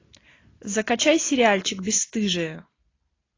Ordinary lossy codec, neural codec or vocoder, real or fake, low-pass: AAC, 32 kbps; none; real; 7.2 kHz